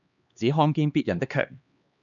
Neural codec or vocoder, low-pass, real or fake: codec, 16 kHz, 2 kbps, X-Codec, HuBERT features, trained on LibriSpeech; 7.2 kHz; fake